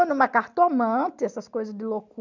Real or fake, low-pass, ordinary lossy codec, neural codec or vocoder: real; 7.2 kHz; none; none